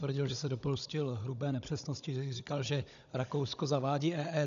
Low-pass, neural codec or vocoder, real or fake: 7.2 kHz; codec, 16 kHz, 16 kbps, FunCodec, trained on Chinese and English, 50 frames a second; fake